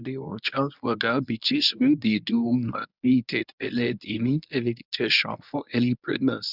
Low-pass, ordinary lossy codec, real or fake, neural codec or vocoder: 5.4 kHz; none; fake; codec, 24 kHz, 0.9 kbps, WavTokenizer, medium speech release version 1